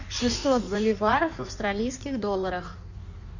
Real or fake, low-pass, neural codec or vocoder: fake; 7.2 kHz; codec, 16 kHz in and 24 kHz out, 1.1 kbps, FireRedTTS-2 codec